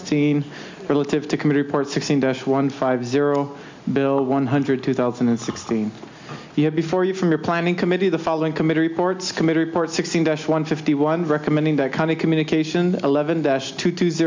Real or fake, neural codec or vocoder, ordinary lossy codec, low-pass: real; none; MP3, 64 kbps; 7.2 kHz